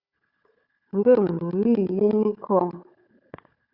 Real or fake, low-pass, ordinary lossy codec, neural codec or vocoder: fake; 5.4 kHz; Opus, 64 kbps; codec, 16 kHz, 4 kbps, FunCodec, trained on Chinese and English, 50 frames a second